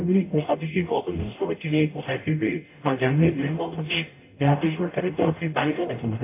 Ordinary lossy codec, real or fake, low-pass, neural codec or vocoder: AAC, 24 kbps; fake; 3.6 kHz; codec, 44.1 kHz, 0.9 kbps, DAC